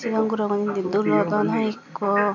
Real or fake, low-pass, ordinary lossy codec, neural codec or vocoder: real; 7.2 kHz; none; none